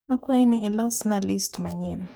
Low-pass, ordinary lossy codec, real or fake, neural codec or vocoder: none; none; fake; codec, 44.1 kHz, 2.6 kbps, DAC